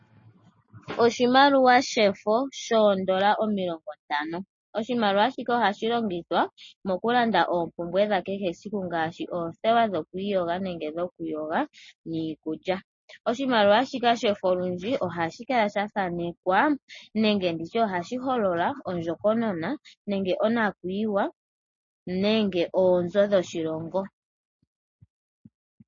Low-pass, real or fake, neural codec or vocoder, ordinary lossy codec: 7.2 kHz; real; none; MP3, 32 kbps